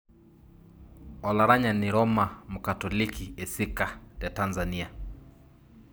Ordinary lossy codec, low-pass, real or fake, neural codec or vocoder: none; none; real; none